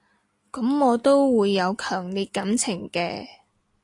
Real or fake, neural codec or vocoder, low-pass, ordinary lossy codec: real; none; 10.8 kHz; AAC, 48 kbps